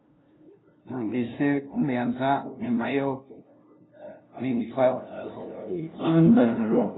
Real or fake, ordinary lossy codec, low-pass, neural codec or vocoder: fake; AAC, 16 kbps; 7.2 kHz; codec, 16 kHz, 0.5 kbps, FunCodec, trained on LibriTTS, 25 frames a second